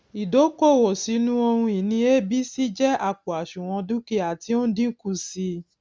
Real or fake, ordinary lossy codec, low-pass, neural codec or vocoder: real; none; none; none